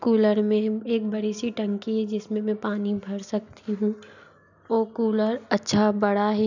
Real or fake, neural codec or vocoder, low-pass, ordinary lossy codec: real; none; 7.2 kHz; none